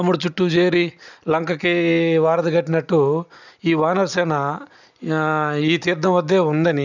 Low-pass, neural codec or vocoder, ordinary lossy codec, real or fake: 7.2 kHz; none; none; real